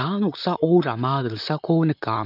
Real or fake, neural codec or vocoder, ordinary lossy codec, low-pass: fake; vocoder, 44.1 kHz, 128 mel bands, Pupu-Vocoder; none; 5.4 kHz